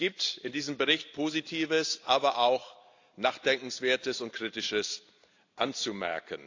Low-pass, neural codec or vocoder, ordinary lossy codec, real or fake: 7.2 kHz; none; AAC, 48 kbps; real